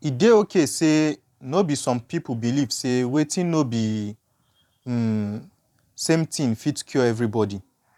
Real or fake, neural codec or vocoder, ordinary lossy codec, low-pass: fake; vocoder, 44.1 kHz, 128 mel bands every 512 samples, BigVGAN v2; none; 19.8 kHz